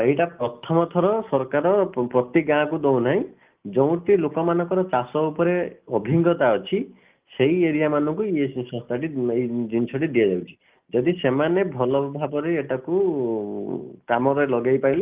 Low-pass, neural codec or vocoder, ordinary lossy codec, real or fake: 3.6 kHz; none; Opus, 32 kbps; real